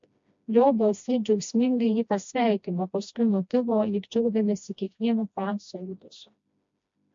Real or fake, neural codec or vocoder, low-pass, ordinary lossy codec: fake; codec, 16 kHz, 1 kbps, FreqCodec, smaller model; 7.2 kHz; MP3, 48 kbps